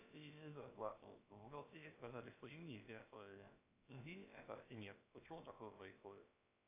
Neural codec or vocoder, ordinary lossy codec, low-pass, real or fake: codec, 16 kHz, about 1 kbps, DyCAST, with the encoder's durations; AAC, 32 kbps; 3.6 kHz; fake